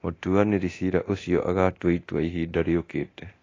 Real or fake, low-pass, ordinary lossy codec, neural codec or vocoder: fake; 7.2 kHz; AAC, 32 kbps; codec, 24 kHz, 0.9 kbps, DualCodec